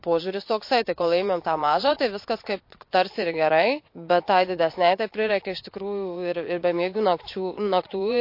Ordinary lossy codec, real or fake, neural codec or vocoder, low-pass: MP3, 32 kbps; fake; vocoder, 22.05 kHz, 80 mel bands, Vocos; 5.4 kHz